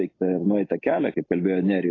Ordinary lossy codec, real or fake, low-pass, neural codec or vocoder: AAC, 32 kbps; real; 7.2 kHz; none